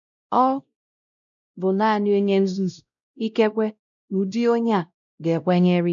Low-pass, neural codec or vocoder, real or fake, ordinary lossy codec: 7.2 kHz; codec, 16 kHz, 0.5 kbps, X-Codec, WavLM features, trained on Multilingual LibriSpeech; fake; none